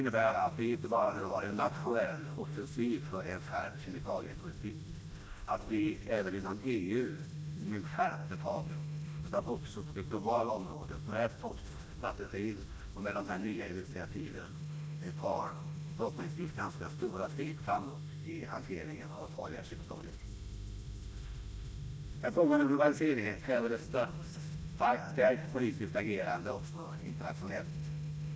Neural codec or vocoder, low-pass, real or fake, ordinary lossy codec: codec, 16 kHz, 1 kbps, FreqCodec, smaller model; none; fake; none